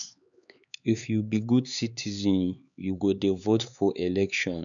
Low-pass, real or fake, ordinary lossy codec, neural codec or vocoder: 7.2 kHz; fake; none; codec, 16 kHz, 4 kbps, X-Codec, HuBERT features, trained on LibriSpeech